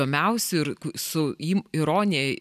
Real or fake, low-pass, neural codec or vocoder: real; 14.4 kHz; none